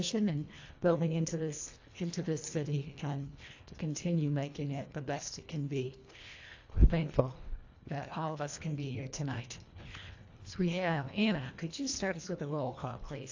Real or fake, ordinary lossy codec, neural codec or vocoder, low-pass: fake; AAC, 48 kbps; codec, 24 kHz, 1.5 kbps, HILCodec; 7.2 kHz